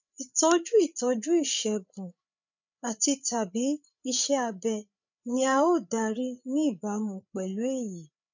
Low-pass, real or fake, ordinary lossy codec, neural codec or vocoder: 7.2 kHz; fake; none; codec, 16 kHz, 8 kbps, FreqCodec, larger model